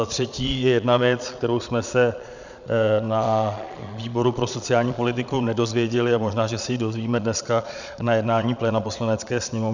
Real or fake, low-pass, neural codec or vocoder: fake; 7.2 kHz; vocoder, 22.05 kHz, 80 mel bands, Vocos